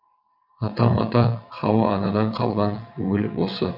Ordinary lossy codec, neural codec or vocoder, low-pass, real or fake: none; vocoder, 22.05 kHz, 80 mel bands, WaveNeXt; 5.4 kHz; fake